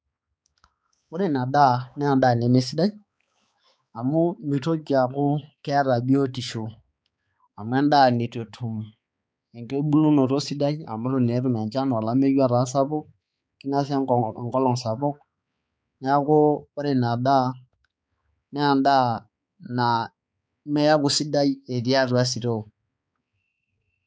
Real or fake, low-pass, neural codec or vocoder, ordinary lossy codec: fake; none; codec, 16 kHz, 4 kbps, X-Codec, HuBERT features, trained on balanced general audio; none